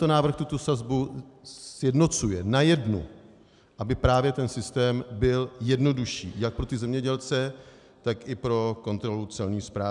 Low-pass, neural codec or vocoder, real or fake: 10.8 kHz; none; real